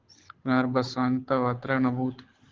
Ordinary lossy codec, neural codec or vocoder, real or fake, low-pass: Opus, 16 kbps; codec, 24 kHz, 6 kbps, HILCodec; fake; 7.2 kHz